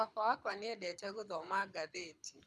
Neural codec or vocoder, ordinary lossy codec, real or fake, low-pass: codec, 24 kHz, 6 kbps, HILCodec; none; fake; none